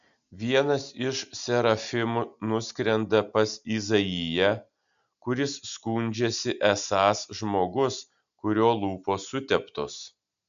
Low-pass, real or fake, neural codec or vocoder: 7.2 kHz; real; none